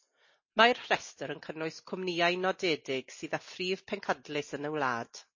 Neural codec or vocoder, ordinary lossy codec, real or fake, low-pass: none; MP3, 32 kbps; real; 7.2 kHz